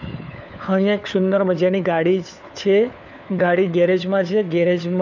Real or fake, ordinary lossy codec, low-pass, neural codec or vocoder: fake; none; 7.2 kHz; codec, 16 kHz, 4 kbps, FunCodec, trained on LibriTTS, 50 frames a second